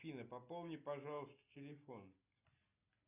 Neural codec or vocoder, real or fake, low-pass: none; real; 3.6 kHz